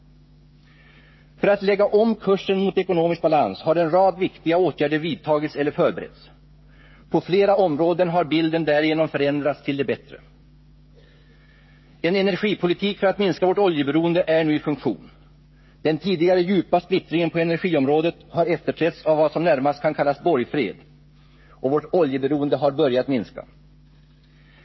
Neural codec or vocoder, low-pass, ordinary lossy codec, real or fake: codec, 16 kHz, 16 kbps, FreqCodec, smaller model; 7.2 kHz; MP3, 24 kbps; fake